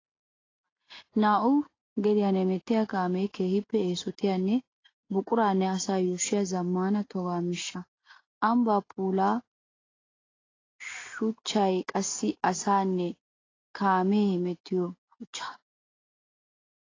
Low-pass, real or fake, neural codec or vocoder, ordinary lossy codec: 7.2 kHz; real; none; AAC, 32 kbps